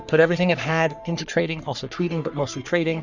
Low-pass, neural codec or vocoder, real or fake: 7.2 kHz; codec, 44.1 kHz, 3.4 kbps, Pupu-Codec; fake